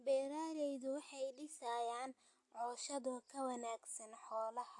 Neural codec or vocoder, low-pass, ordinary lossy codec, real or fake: none; 10.8 kHz; AAC, 64 kbps; real